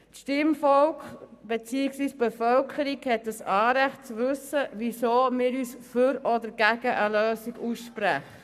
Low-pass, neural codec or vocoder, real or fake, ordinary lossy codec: 14.4 kHz; codec, 44.1 kHz, 7.8 kbps, Pupu-Codec; fake; none